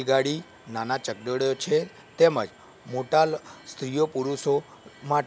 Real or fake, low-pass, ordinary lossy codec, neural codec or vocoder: real; none; none; none